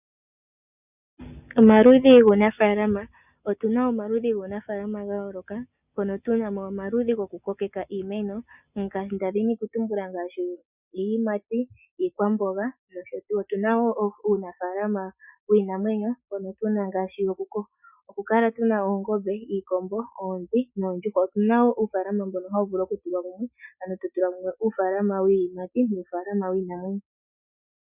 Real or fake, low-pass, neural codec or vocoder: real; 3.6 kHz; none